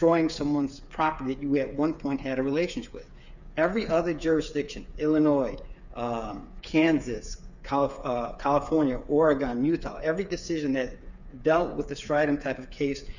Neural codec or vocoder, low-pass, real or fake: codec, 16 kHz, 8 kbps, FreqCodec, smaller model; 7.2 kHz; fake